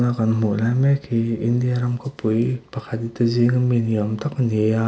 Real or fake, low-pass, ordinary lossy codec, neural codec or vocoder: real; none; none; none